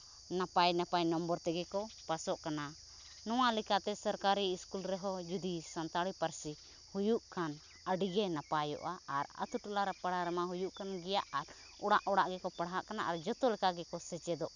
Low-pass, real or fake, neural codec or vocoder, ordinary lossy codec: 7.2 kHz; real; none; none